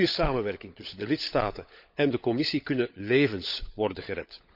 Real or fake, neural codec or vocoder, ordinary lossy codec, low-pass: fake; codec, 16 kHz, 16 kbps, FunCodec, trained on LibriTTS, 50 frames a second; none; 5.4 kHz